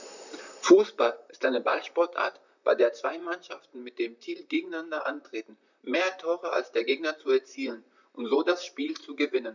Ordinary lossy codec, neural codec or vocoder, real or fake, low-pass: none; vocoder, 44.1 kHz, 128 mel bands, Pupu-Vocoder; fake; 7.2 kHz